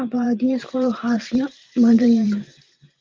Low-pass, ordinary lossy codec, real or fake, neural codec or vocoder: 7.2 kHz; Opus, 32 kbps; fake; vocoder, 44.1 kHz, 128 mel bands every 512 samples, BigVGAN v2